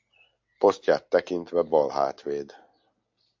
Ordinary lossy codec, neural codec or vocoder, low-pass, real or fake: AAC, 48 kbps; none; 7.2 kHz; real